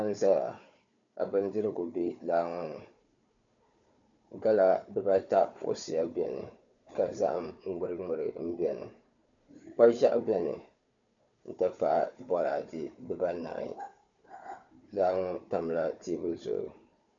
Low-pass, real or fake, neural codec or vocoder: 7.2 kHz; fake; codec, 16 kHz, 4 kbps, FunCodec, trained on Chinese and English, 50 frames a second